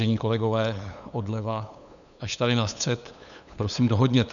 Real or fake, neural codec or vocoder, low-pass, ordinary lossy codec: fake; codec, 16 kHz, 8 kbps, FunCodec, trained on LibriTTS, 25 frames a second; 7.2 kHz; MP3, 96 kbps